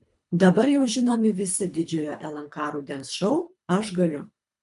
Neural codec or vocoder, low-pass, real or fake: codec, 24 kHz, 3 kbps, HILCodec; 10.8 kHz; fake